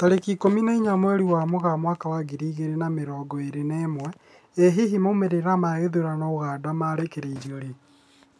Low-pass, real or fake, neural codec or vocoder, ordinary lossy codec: none; real; none; none